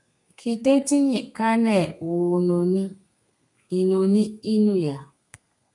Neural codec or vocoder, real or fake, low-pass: codec, 32 kHz, 1.9 kbps, SNAC; fake; 10.8 kHz